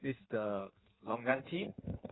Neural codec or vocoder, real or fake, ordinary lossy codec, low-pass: codec, 16 kHz in and 24 kHz out, 1.1 kbps, FireRedTTS-2 codec; fake; AAC, 16 kbps; 7.2 kHz